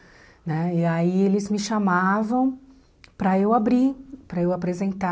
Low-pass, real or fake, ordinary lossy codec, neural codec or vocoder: none; real; none; none